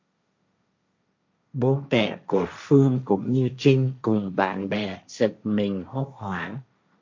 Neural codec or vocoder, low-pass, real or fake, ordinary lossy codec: codec, 16 kHz, 1.1 kbps, Voila-Tokenizer; 7.2 kHz; fake; MP3, 48 kbps